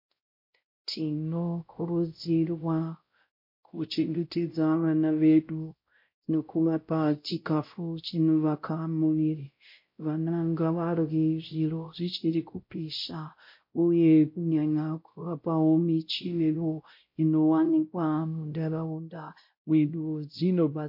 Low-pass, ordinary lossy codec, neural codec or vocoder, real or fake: 5.4 kHz; MP3, 32 kbps; codec, 16 kHz, 0.5 kbps, X-Codec, WavLM features, trained on Multilingual LibriSpeech; fake